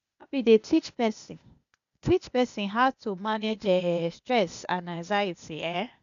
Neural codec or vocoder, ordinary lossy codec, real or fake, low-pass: codec, 16 kHz, 0.8 kbps, ZipCodec; none; fake; 7.2 kHz